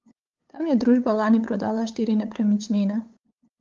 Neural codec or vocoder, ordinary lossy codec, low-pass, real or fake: codec, 16 kHz, 8 kbps, FunCodec, trained on LibriTTS, 25 frames a second; Opus, 32 kbps; 7.2 kHz; fake